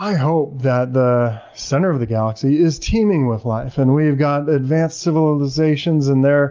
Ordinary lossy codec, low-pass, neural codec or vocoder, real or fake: Opus, 24 kbps; 7.2 kHz; none; real